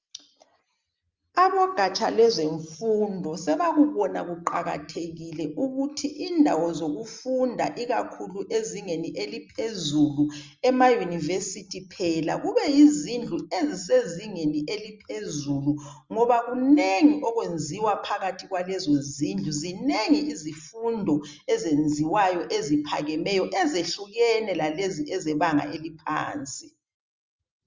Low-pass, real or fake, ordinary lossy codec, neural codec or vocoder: 7.2 kHz; real; Opus, 32 kbps; none